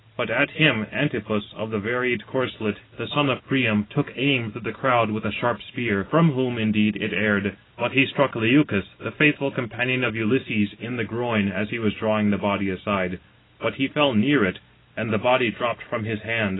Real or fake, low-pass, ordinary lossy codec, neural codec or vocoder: real; 7.2 kHz; AAC, 16 kbps; none